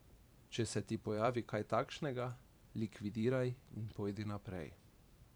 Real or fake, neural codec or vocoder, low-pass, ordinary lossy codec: fake; vocoder, 44.1 kHz, 128 mel bands every 512 samples, BigVGAN v2; none; none